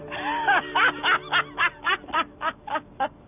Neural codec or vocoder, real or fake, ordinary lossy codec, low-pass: none; real; none; 3.6 kHz